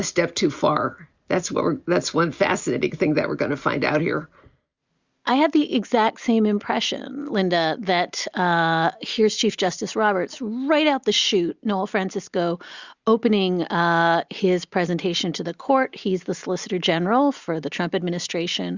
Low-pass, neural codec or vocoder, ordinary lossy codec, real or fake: 7.2 kHz; none; Opus, 64 kbps; real